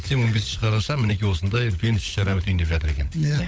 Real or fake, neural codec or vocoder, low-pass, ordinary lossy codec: fake; codec, 16 kHz, 16 kbps, FunCodec, trained on LibriTTS, 50 frames a second; none; none